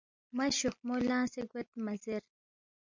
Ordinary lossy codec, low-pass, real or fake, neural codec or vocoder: MP3, 64 kbps; 7.2 kHz; real; none